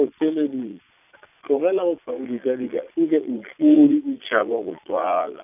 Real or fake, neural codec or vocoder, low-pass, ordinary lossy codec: fake; vocoder, 22.05 kHz, 80 mel bands, Vocos; 3.6 kHz; none